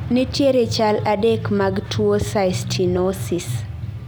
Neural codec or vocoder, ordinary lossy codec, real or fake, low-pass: none; none; real; none